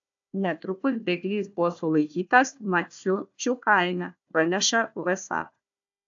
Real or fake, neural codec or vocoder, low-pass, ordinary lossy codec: fake; codec, 16 kHz, 1 kbps, FunCodec, trained on Chinese and English, 50 frames a second; 7.2 kHz; AAC, 64 kbps